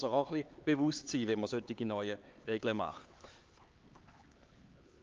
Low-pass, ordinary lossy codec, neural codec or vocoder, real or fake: 7.2 kHz; Opus, 24 kbps; codec, 16 kHz, 4 kbps, X-Codec, HuBERT features, trained on LibriSpeech; fake